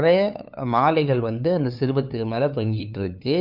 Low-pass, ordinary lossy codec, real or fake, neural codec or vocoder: 5.4 kHz; none; fake; codec, 16 kHz, 2 kbps, FunCodec, trained on LibriTTS, 25 frames a second